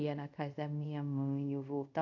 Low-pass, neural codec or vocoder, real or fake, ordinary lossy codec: 7.2 kHz; codec, 24 kHz, 0.5 kbps, DualCodec; fake; none